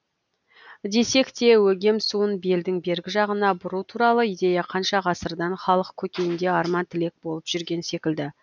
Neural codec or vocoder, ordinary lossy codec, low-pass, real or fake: none; none; 7.2 kHz; real